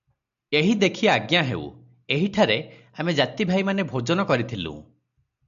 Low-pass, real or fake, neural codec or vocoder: 7.2 kHz; real; none